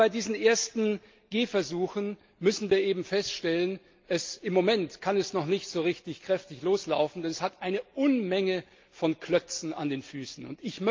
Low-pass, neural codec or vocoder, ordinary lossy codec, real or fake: 7.2 kHz; none; Opus, 24 kbps; real